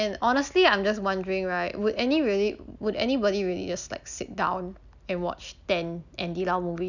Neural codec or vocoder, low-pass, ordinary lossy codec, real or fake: none; 7.2 kHz; none; real